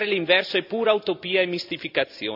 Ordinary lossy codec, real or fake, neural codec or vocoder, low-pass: none; real; none; 5.4 kHz